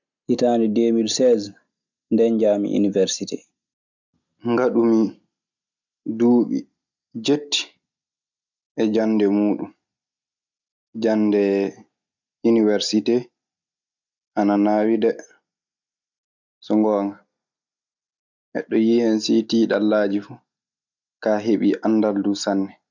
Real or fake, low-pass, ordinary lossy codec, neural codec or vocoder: real; 7.2 kHz; none; none